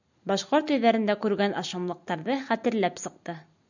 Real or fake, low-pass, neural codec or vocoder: real; 7.2 kHz; none